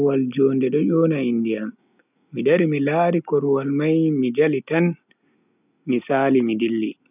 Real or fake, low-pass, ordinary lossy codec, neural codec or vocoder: real; 3.6 kHz; none; none